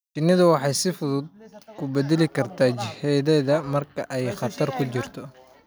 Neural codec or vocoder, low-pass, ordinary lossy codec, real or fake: none; none; none; real